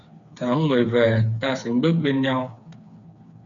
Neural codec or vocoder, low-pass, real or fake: codec, 16 kHz, 4 kbps, FreqCodec, smaller model; 7.2 kHz; fake